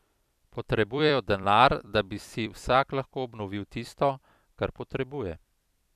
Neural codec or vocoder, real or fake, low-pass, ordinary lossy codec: vocoder, 44.1 kHz, 128 mel bands every 256 samples, BigVGAN v2; fake; 14.4 kHz; none